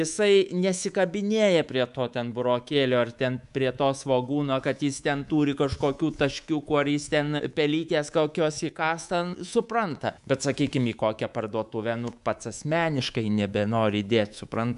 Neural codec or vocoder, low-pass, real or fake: codec, 24 kHz, 3.1 kbps, DualCodec; 10.8 kHz; fake